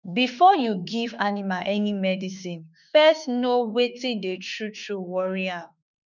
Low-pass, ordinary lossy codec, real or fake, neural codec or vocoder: 7.2 kHz; none; fake; autoencoder, 48 kHz, 32 numbers a frame, DAC-VAE, trained on Japanese speech